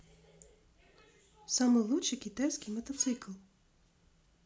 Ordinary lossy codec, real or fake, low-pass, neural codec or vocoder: none; real; none; none